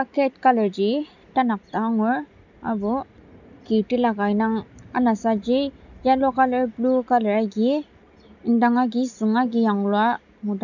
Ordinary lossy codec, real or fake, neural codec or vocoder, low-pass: none; real; none; 7.2 kHz